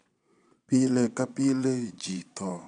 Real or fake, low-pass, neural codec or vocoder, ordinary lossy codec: fake; 9.9 kHz; vocoder, 22.05 kHz, 80 mel bands, Vocos; none